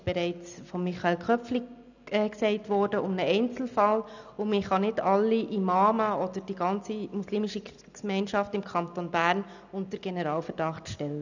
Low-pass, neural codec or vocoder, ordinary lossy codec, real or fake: 7.2 kHz; none; none; real